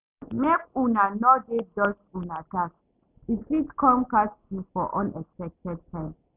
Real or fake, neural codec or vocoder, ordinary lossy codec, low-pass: real; none; none; 3.6 kHz